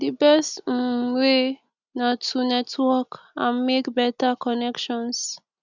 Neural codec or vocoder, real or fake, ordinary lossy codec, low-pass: none; real; none; 7.2 kHz